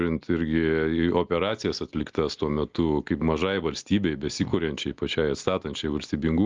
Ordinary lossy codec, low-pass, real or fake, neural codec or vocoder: Opus, 32 kbps; 7.2 kHz; real; none